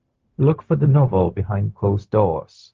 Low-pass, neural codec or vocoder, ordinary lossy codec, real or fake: 7.2 kHz; codec, 16 kHz, 0.4 kbps, LongCat-Audio-Codec; Opus, 24 kbps; fake